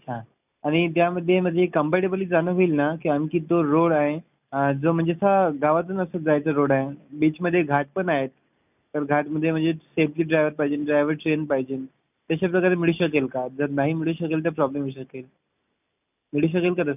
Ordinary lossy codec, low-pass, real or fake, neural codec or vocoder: none; 3.6 kHz; real; none